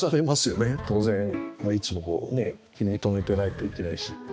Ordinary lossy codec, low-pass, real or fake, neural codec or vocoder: none; none; fake; codec, 16 kHz, 2 kbps, X-Codec, HuBERT features, trained on balanced general audio